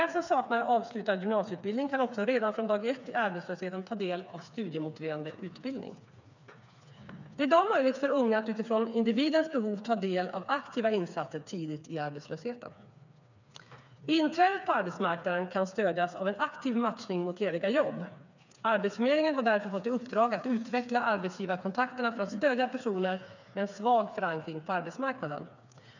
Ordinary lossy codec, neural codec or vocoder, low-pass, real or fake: none; codec, 16 kHz, 4 kbps, FreqCodec, smaller model; 7.2 kHz; fake